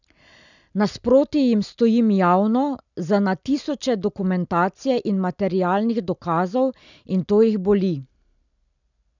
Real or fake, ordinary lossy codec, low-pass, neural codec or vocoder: real; none; 7.2 kHz; none